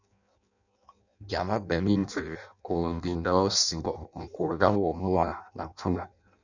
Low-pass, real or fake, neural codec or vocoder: 7.2 kHz; fake; codec, 16 kHz in and 24 kHz out, 0.6 kbps, FireRedTTS-2 codec